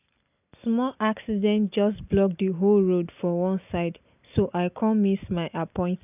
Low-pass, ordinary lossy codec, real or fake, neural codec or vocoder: 3.6 kHz; none; real; none